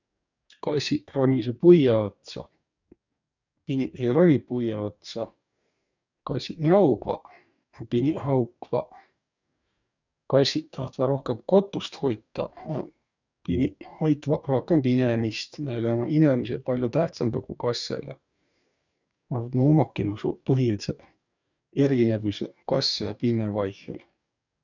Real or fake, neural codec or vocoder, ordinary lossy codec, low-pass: fake; codec, 44.1 kHz, 2.6 kbps, DAC; none; 7.2 kHz